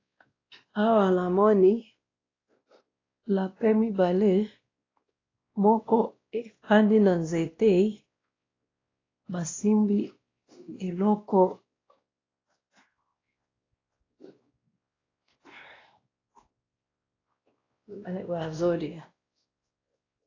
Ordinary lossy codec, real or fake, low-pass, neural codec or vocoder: AAC, 32 kbps; fake; 7.2 kHz; codec, 16 kHz, 1 kbps, X-Codec, WavLM features, trained on Multilingual LibriSpeech